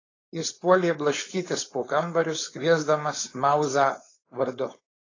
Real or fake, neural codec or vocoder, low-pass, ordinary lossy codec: fake; codec, 16 kHz, 4.8 kbps, FACodec; 7.2 kHz; AAC, 32 kbps